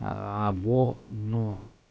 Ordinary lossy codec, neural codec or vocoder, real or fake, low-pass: none; codec, 16 kHz, about 1 kbps, DyCAST, with the encoder's durations; fake; none